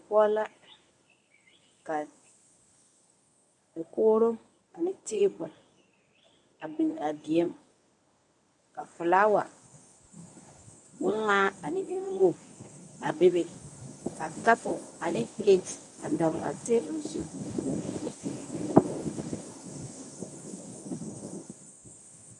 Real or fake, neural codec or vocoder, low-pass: fake; codec, 24 kHz, 0.9 kbps, WavTokenizer, medium speech release version 1; 10.8 kHz